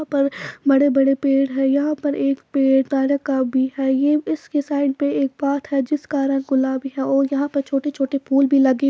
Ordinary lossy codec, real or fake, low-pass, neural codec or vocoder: none; real; none; none